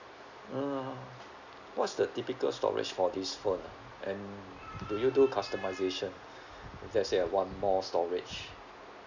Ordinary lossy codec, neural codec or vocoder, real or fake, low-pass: none; none; real; 7.2 kHz